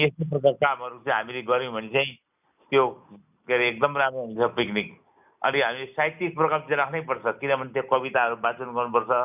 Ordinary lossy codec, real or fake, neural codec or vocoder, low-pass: none; real; none; 3.6 kHz